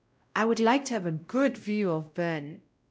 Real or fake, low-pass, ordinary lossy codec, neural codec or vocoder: fake; none; none; codec, 16 kHz, 0.5 kbps, X-Codec, WavLM features, trained on Multilingual LibriSpeech